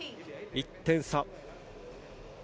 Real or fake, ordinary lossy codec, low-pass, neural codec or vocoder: real; none; none; none